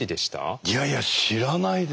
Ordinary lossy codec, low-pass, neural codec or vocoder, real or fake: none; none; none; real